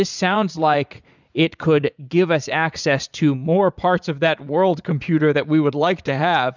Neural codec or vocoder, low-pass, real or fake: vocoder, 44.1 kHz, 80 mel bands, Vocos; 7.2 kHz; fake